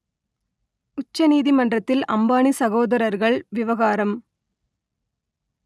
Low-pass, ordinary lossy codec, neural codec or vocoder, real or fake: none; none; none; real